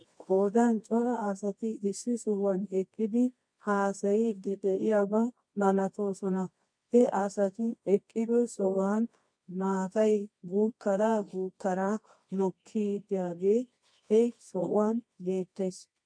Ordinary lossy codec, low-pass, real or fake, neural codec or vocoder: MP3, 48 kbps; 9.9 kHz; fake; codec, 24 kHz, 0.9 kbps, WavTokenizer, medium music audio release